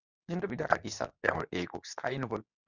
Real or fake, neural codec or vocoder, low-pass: fake; codec, 24 kHz, 0.9 kbps, WavTokenizer, medium speech release version 2; 7.2 kHz